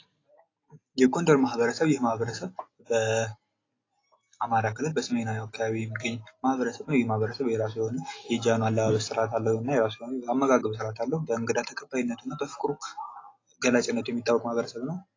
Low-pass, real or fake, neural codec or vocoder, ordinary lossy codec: 7.2 kHz; real; none; AAC, 32 kbps